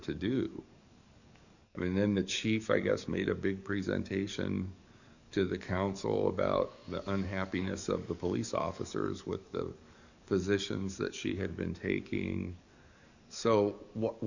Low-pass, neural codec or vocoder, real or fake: 7.2 kHz; codec, 44.1 kHz, 7.8 kbps, DAC; fake